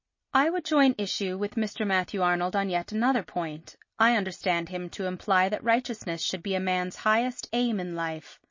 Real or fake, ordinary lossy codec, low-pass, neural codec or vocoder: real; MP3, 32 kbps; 7.2 kHz; none